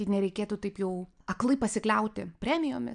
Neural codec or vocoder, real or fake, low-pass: none; real; 9.9 kHz